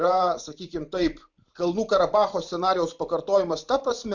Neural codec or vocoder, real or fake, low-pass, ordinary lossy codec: vocoder, 44.1 kHz, 128 mel bands every 512 samples, BigVGAN v2; fake; 7.2 kHz; Opus, 64 kbps